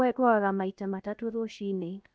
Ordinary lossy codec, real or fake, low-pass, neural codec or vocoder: none; fake; none; codec, 16 kHz, 0.7 kbps, FocalCodec